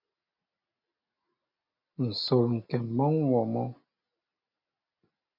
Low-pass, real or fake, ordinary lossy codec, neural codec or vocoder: 5.4 kHz; real; MP3, 48 kbps; none